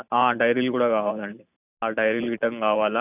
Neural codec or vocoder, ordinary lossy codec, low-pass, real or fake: vocoder, 44.1 kHz, 128 mel bands every 512 samples, BigVGAN v2; none; 3.6 kHz; fake